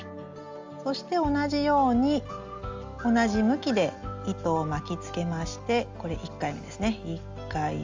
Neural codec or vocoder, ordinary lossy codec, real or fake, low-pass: none; Opus, 32 kbps; real; 7.2 kHz